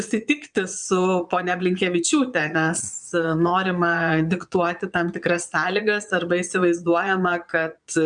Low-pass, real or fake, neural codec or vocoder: 9.9 kHz; fake; vocoder, 22.05 kHz, 80 mel bands, Vocos